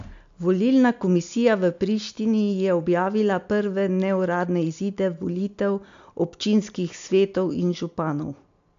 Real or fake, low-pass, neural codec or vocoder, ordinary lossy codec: real; 7.2 kHz; none; MP3, 64 kbps